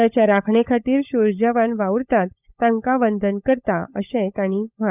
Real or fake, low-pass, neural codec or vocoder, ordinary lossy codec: fake; 3.6 kHz; codec, 16 kHz, 16 kbps, FunCodec, trained on LibriTTS, 50 frames a second; none